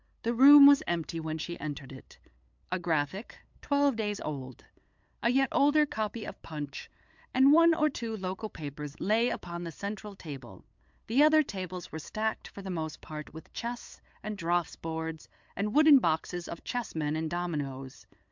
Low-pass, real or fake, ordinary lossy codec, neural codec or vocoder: 7.2 kHz; fake; MP3, 64 kbps; codec, 16 kHz, 8 kbps, FunCodec, trained on LibriTTS, 25 frames a second